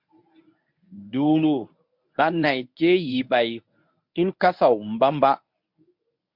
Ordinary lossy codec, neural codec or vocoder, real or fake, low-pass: MP3, 48 kbps; codec, 24 kHz, 0.9 kbps, WavTokenizer, medium speech release version 1; fake; 5.4 kHz